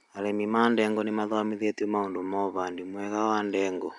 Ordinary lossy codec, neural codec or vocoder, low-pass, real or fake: none; none; 10.8 kHz; real